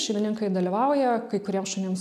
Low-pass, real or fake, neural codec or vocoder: 14.4 kHz; real; none